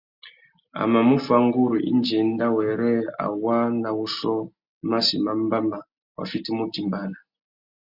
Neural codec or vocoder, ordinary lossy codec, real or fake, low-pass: none; Opus, 64 kbps; real; 5.4 kHz